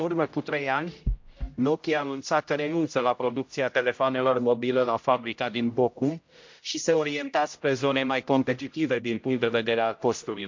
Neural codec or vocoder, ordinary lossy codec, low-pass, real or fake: codec, 16 kHz, 0.5 kbps, X-Codec, HuBERT features, trained on general audio; MP3, 48 kbps; 7.2 kHz; fake